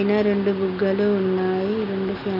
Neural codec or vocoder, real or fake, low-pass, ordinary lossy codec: none; real; 5.4 kHz; none